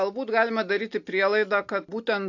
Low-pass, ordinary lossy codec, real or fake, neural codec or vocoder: 7.2 kHz; AAC, 48 kbps; real; none